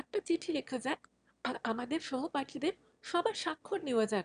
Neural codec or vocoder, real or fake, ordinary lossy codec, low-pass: autoencoder, 22.05 kHz, a latent of 192 numbers a frame, VITS, trained on one speaker; fake; none; 9.9 kHz